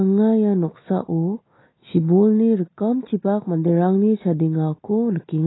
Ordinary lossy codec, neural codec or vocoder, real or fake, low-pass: AAC, 16 kbps; none; real; 7.2 kHz